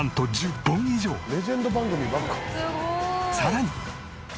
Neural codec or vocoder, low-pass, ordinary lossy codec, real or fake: none; none; none; real